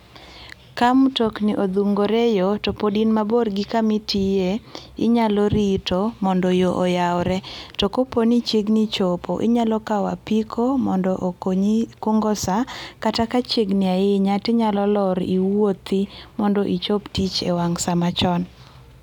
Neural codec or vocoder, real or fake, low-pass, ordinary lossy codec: none; real; 19.8 kHz; none